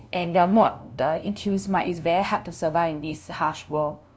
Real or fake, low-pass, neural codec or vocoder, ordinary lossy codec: fake; none; codec, 16 kHz, 0.5 kbps, FunCodec, trained on LibriTTS, 25 frames a second; none